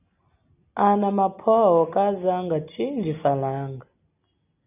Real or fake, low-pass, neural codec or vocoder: real; 3.6 kHz; none